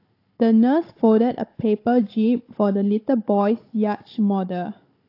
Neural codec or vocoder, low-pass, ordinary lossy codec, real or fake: codec, 16 kHz, 16 kbps, FunCodec, trained on Chinese and English, 50 frames a second; 5.4 kHz; AAC, 32 kbps; fake